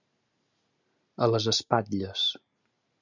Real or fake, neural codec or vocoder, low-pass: real; none; 7.2 kHz